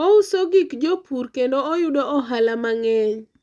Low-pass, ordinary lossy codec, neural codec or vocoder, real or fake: none; none; none; real